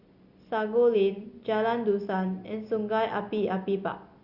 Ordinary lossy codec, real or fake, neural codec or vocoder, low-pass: Opus, 64 kbps; real; none; 5.4 kHz